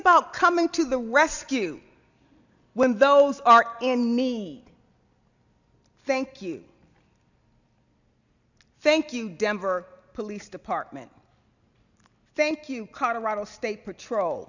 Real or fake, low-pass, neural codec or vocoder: real; 7.2 kHz; none